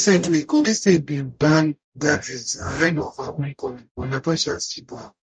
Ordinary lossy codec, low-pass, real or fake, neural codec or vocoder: MP3, 48 kbps; 9.9 kHz; fake; codec, 44.1 kHz, 0.9 kbps, DAC